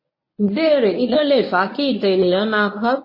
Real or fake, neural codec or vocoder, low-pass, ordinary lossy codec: fake; codec, 24 kHz, 0.9 kbps, WavTokenizer, medium speech release version 1; 5.4 kHz; MP3, 24 kbps